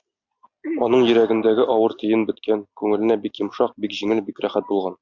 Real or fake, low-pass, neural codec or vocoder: real; 7.2 kHz; none